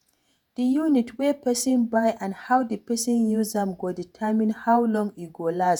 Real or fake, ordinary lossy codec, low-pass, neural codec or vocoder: fake; none; none; vocoder, 48 kHz, 128 mel bands, Vocos